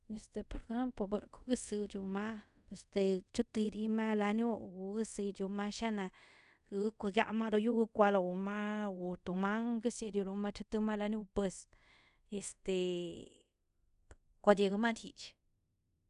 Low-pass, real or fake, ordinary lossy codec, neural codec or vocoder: 10.8 kHz; fake; none; codec, 24 kHz, 0.5 kbps, DualCodec